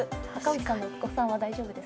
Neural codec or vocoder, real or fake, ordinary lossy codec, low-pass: none; real; none; none